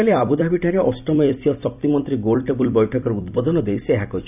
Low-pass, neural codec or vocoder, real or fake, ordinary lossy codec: 3.6 kHz; vocoder, 44.1 kHz, 128 mel bands every 256 samples, BigVGAN v2; fake; none